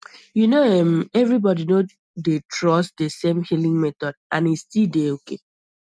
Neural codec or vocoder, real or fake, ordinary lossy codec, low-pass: none; real; none; none